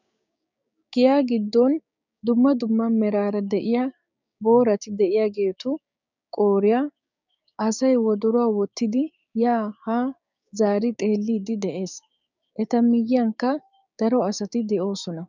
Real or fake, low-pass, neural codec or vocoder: fake; 7.2 kHz; codec, 16 kHz, 6 kbps, DAC